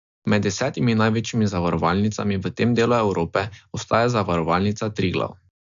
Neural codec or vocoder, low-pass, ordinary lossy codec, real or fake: none; 7.2 kHz; MP3, 64 kbps; real